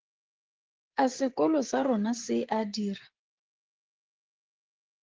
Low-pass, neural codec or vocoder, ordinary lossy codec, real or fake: 7.2 kHz; codec, 16 kHz, 16 kbps, FreqCodec, smaller model; Opus, 16 kbps; fake